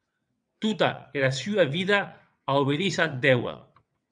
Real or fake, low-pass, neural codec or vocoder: fake; 9.9 kHz; vocoder, 22.05 kHz, 80 mel bands, WaveNeXt